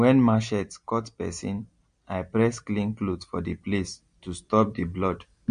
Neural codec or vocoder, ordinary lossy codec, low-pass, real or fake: none; MP3, 48 kbps; 9.9 kHz; real